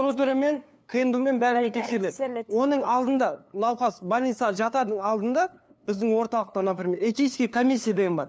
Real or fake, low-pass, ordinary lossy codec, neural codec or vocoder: fake; none; none; codec, 16 kHz, 2 kbps, FunCodec, trained on LibriTTS, 25 frames a second